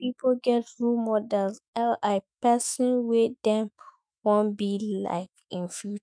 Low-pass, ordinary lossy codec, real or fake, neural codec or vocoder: 9.9 kHz; none; fake; autoencoder, 48 kHz, 128 numbers a frame, DAC-VAE, trained on Japanese speech